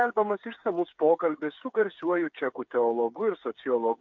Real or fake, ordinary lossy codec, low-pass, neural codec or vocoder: fake; MP3, 48 kbps; 7.2 kHz; codec, 16 kHz, 8 kbps, FreqCodec, smaller model